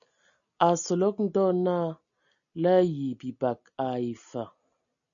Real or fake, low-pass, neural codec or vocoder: real; 7.2 kHz; none